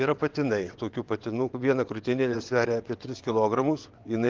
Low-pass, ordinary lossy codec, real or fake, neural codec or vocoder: 7.2 kHz; Opus, 32 kbps; fake; vocoder, 22.05 kHz, 80 mel bands, WaveNeXt